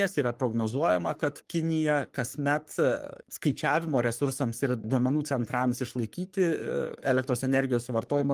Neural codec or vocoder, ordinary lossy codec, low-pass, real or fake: codec, 44.1 kHz, 3.4 kbps, Pupu-Codec; Opus, 32 kbps; 14.4 kHz; fake